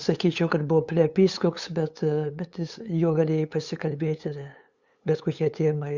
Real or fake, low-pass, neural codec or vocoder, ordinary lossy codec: fake; 7.2 kHz; codec, 16 kHz, 8 kbps, FunCodec, trained on LibriTTS, 25 frames a second; Opus, 64 kbps